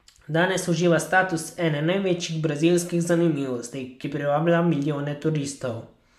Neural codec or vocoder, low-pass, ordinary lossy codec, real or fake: none; 14.4 kHz; MP3, 96 kbps; real